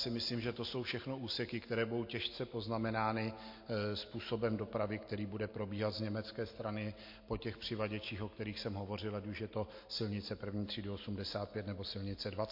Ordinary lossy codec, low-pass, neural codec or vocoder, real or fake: MP3, 32 kbps; 5.4 kHz; none; real